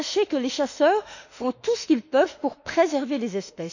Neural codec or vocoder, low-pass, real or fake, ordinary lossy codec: autoencoder, 48 kHz, 32 numbers a frame, DAC-VAE, trained on Japanese speech; 7.2 kHz; fake; none